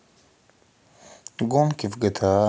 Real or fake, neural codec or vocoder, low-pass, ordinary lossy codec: real; none; none; none